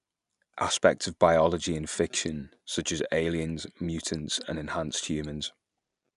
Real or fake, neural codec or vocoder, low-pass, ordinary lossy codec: real; none; 10.8 kHz; none